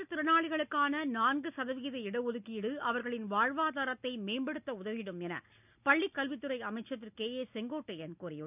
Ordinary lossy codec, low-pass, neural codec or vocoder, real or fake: none; 3.6 kHz; none; real